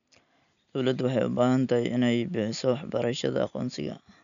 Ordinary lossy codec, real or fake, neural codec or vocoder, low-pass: none; real; none; 7.2 kHz